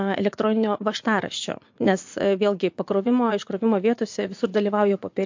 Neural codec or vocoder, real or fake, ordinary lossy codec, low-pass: vocoder, 44.1 kHz, 80 mel bands, Vocos; fake; MP3, 48 kbps; 7.2 kHz